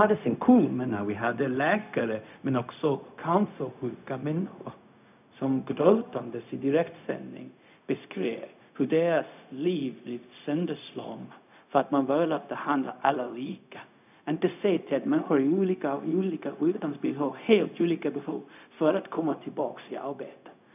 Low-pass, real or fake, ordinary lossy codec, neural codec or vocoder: 3.6 kHz; fake; none; codec, 16 kHz, 0.4 kbps, LongCat-Audio-Codec